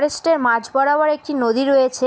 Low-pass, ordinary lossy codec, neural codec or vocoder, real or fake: none; none; none; real